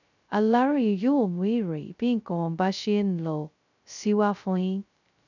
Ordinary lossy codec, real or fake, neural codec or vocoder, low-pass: none; fake; codec, 16 kHz, 0.2 kbps, FocalCodec; 7.2 kHz